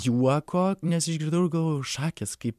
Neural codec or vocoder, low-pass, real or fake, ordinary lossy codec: vocoder, 44.1 kHz, 128 mel bands every 256 samples, BigVGAN v2; 14.4 kHz; fake; MP3, 96 kbps